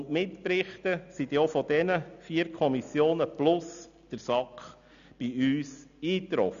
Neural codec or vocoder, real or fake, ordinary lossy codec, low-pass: none; real; none; 7.2 kHz